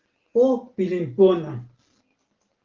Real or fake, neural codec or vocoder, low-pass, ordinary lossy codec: real; none; 7.2 kHz; Opus, 16 kbps